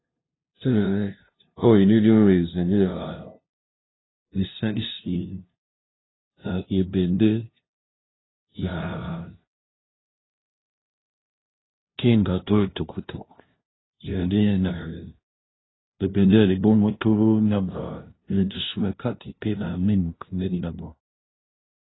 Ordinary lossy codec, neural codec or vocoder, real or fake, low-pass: AAC, 16 kbps; codec, 16 kHz, 0.5 kbps, FunCodec, trained on LibriTTS, 25 frames a second; fake; 7.2 kHz